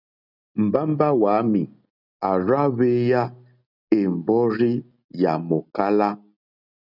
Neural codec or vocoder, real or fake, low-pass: none; real; 5.4 kHz